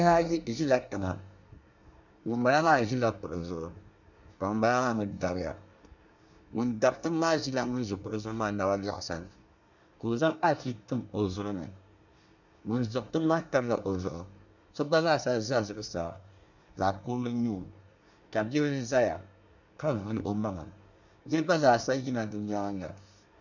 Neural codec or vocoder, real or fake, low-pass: codec, 24 kHz, 1 kbps, SNAC; fake; 7.2 kHz